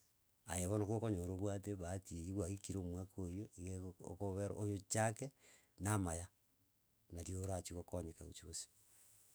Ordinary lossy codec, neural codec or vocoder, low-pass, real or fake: none; autoencoder, 48 kHz, 128 numbers a frame, DAC-VAE, trained on Japanese speech; none; fake